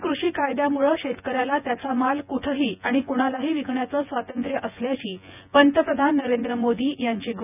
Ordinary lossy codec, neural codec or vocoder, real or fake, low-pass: none; vocoder, 24 kHz, 100 mel bands, Vocos; fake; 3.6 kHz